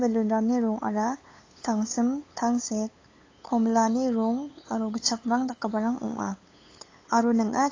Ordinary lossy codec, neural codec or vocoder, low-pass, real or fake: AAC, 32 kbps; codec, 16 kHz, 8 kbps, FunCodec, trained on LibriTTS, 25 frames a second; 7.2 kHz; fake